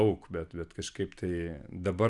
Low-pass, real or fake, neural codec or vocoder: 10.8 kHz; real; none